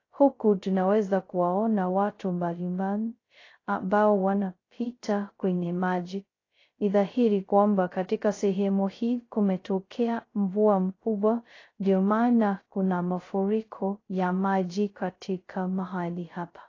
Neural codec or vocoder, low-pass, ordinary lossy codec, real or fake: codec, 16 kHz, 0.2 kbps, FocalCodec; 7.2 kHz; AAC, 32 kbps; fake